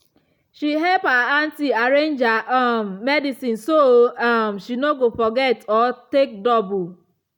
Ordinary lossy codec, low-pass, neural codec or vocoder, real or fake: none; 19.8 kHz; none; real